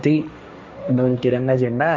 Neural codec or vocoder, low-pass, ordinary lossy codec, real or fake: codec, 16 kHz, 1.1 kbps, Voila-Tokenizer; none; none; fake